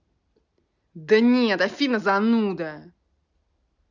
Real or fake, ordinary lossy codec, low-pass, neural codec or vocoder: real; none; 7.2 kHz; none